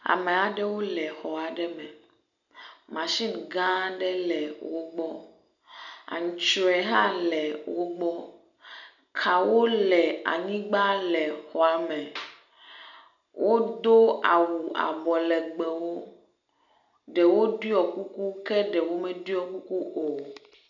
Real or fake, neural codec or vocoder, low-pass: real; none; 7.2 kHz